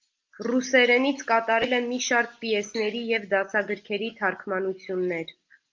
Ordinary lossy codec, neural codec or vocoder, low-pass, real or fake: Opus, 24 kbps; none; 7.2 kHz; real